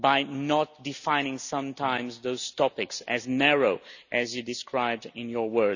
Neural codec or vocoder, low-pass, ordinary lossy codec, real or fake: none; 7.2 kHz; none; real